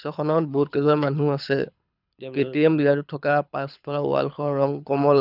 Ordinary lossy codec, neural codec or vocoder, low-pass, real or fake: none; codec, 24 kHz, 6 kbps, HILCodec; 5.4 kHz; fake